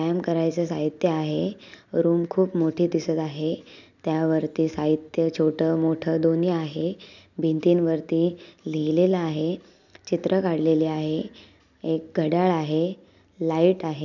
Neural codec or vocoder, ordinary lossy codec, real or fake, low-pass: none; none; real; 7.2 kHz